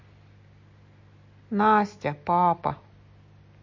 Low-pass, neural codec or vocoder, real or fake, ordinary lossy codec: 7.2 kHz; none; real; MP3, 32 kbps